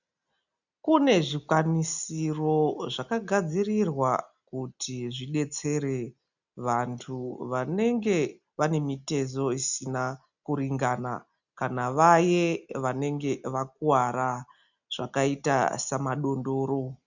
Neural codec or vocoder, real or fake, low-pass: none; real; 7.2 kHz